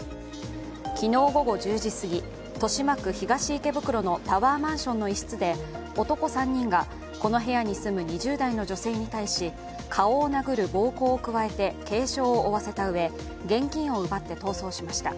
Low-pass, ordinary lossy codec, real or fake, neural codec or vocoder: none; none; real; none